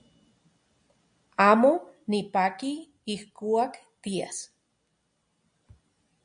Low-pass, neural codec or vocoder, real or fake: 9.9 kHz; none; real